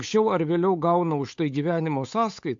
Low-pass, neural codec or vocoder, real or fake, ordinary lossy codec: 7.2 kHz; codec, 16 kHz, 8 kbps, FunCodec, trained on Chinese and English, 25 frames a second; fake; MP3, 48 kbps